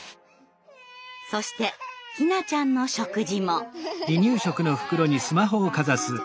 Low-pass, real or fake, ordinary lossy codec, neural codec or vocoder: none; real; none; none